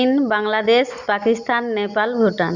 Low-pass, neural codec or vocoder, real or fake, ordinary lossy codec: 7.2 kHz; none; real; Opus, 64 kbps